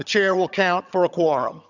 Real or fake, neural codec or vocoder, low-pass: fake; vocoder, 22.05 kHz, 80 mel bands, HiFi-GAN; 7.2 kHz